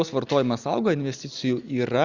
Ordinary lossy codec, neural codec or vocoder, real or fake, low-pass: Opus, 64 kbps; none; real; 7.2 kHz